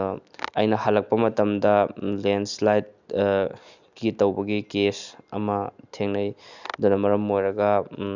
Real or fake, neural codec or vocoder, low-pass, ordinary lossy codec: real; none; 7.2 kHz; Opus, 64 kbps